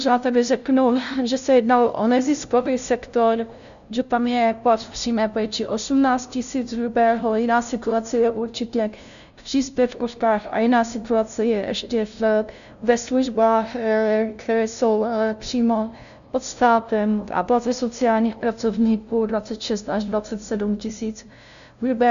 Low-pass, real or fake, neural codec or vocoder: 7.2 kHz; fake; codec, 16 kHz, 0.5 kbps, FunCodec, trained on LibriTTS, 25 frames a second